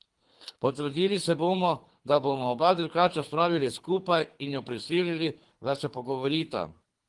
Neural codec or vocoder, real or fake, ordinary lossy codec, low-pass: codec, 24 kHz, 3 kbps, HILCodec; fake; Opus, 24 kbps; 10.8 kHz